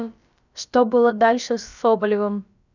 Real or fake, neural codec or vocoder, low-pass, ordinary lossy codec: fake; codec, 16 kHz, about 1 kbps, DyCAST, with the encoder's durations; 7.2 kHz; none